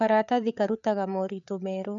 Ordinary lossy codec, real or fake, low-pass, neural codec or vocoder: none; fake; 7.2 kHz; codec, 16 kHz, 8 kbps, FreqCodec, larger model